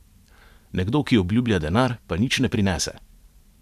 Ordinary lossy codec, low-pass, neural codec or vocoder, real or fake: none; 14.4 kHz; vocoder, 44.1 kHz, 128 mel bands every 512 samples, BigVGAN v2; fake